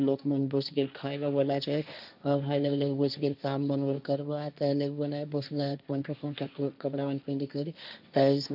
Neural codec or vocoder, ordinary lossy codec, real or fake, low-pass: codec, 16 kHz, 1.1 kbps, Voila-Tokenizer; none; fake; 5.4 kHz